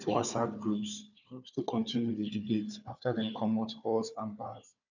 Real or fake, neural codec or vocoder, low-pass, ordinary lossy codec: fake; codec, 16 kHz, 4 kbps, FunCodec, trained on Chinese and English, 50 frames a second; 7.2 kHz; none